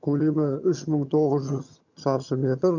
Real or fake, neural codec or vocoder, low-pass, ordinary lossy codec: fake; vocoder, 22.05 kHz, 80 mel bands, HiFi-GAN; 7.2 kHz; none